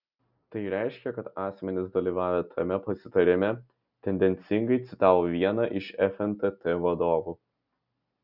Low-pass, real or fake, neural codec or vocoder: 5.4 kHz; real; none